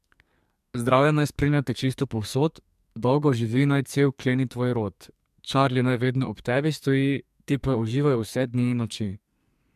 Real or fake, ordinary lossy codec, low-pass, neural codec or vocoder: fake; MP3, 96 kbps; 14.4 kHz; codec, 44.1 kHz, 2.6 kbps, SNAC